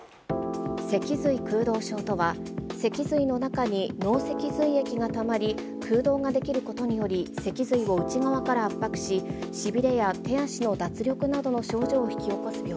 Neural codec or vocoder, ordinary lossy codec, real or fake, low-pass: none; none; real; none